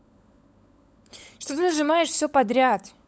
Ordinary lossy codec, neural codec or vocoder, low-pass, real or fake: none; codec, 16 kHz, 16 kbps, FunCodec, trained on LibriTTS, 50 frames a second; none; fake